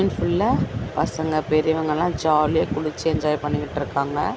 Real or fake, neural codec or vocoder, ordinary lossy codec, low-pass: real; none; none; none